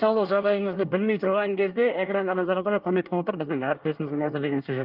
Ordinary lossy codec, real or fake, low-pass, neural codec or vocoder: Opus, 24 kbps; fake; 5.4 kHz; codec, 24 kHz, 1 kbps, SNAC